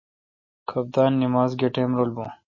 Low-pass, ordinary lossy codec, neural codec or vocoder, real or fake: 7.2 kHz; MP3, 32 kbps; none; real